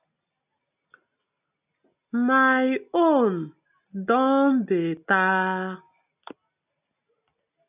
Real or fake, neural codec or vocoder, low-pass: real; none; 3.6 kHz